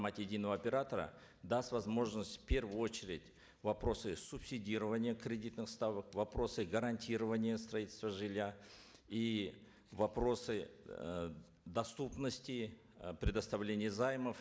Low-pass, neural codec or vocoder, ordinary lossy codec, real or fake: none; none; none; real